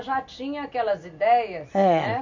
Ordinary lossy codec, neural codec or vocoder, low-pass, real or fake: MP3, 64 kbps; none; 7.2 kHz; real